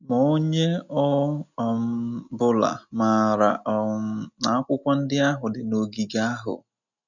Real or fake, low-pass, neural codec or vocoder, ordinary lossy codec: real; 7.2 kHz; none; none